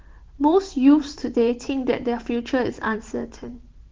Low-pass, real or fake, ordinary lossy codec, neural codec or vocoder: 7.2 kHz; fake; Opus, 16 kbps; vocoder, 44.1 kHz, 128 mel bands every 512 samples, BigVGAN v2